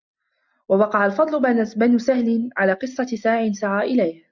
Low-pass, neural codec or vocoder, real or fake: 7.2 kHz; none; real